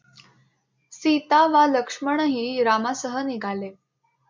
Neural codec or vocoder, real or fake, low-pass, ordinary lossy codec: none; real; 7.2 kHz; MP3, 64 kbps